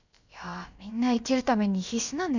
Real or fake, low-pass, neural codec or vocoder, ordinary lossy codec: fake; 7.2 kHz; codec, 16 kHz, about 1 kbps, DyCAST, with the encoder's durations; none